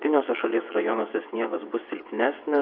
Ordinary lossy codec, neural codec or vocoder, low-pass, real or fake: AAC, 48 kbps; vocoder, 22.05 kHz, 80 mel bands, WaveNeXt; 5.4 kHz; fake